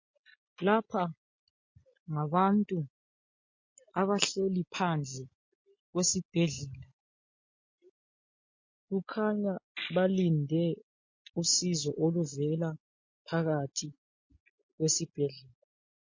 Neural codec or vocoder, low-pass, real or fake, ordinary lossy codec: none; 7.2 kHz; real; MP3, 32 kbps